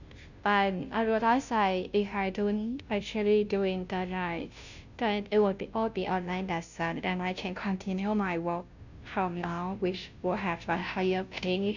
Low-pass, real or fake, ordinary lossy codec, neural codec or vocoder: 7.2 kHz; fake; none; codec, 16 kHz, 0.5 kbps, FunCodec, trained on Chinese and English, 25 frames a second